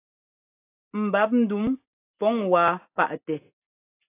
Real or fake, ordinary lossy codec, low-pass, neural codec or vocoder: real; AAC, 24 kbps; 3.6 kHz; none